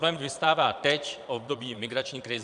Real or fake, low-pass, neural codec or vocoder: fake; 9.9 kHz; vocoder, 22.05 kHz, 80 mel bands, Vocos